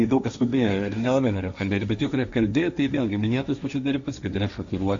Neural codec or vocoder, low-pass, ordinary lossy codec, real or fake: codec, 16 kHz, 1.1 kbps, Voila-Tokenizer; 7.2 kHz; AAC, 64 kbps; fake